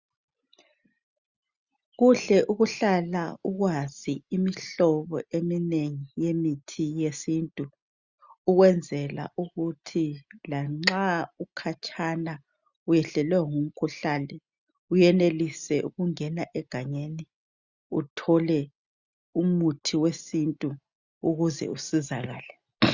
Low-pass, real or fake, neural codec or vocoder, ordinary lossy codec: 7.2 kHz; real; none; Opus, 64 kbps